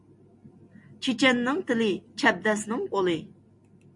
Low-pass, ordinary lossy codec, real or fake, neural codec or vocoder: 10.8 kHz; MP3, 48 kbps; real; none